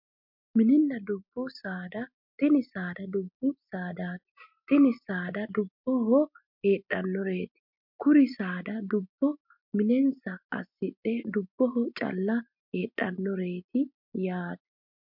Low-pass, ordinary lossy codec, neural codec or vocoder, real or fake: 5.4 kHz; MP3, 48 kbps; none; real